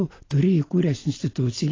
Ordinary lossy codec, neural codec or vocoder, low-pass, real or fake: AAC, 32 kbps; none; 7.2 kHz; real